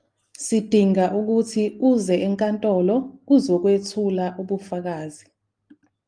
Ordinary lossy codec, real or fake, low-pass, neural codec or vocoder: Opus, 32 kbps; real; 9.9 kHz; none